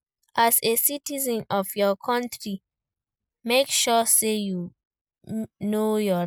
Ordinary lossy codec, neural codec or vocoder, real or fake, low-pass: none; none; real; none